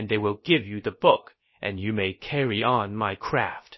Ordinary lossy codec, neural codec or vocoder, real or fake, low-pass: MP3, 24 kbps; codec, 16 kHz, about 1 kbps, DyCAST, with the encoder's durations; fake; 7.2 kHz